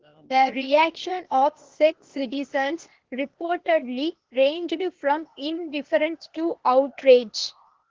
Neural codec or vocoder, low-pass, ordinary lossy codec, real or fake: codec, 16 kHz, 0.8 kbps, ZipCodec; 7.2 kHz; Opus, 16 kbps; fake